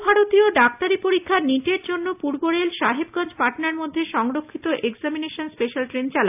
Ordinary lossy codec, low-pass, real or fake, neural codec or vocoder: AAC, 32 kbps; 3.6 kHz; real; none